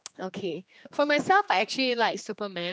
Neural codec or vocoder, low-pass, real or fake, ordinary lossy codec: codec, 16 kHz, 2 kbps, X-Codec, HuBERT features, trained on general audio; none; fake; none